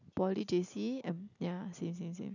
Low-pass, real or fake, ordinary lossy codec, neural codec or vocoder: 7.2 kHz; real; none; none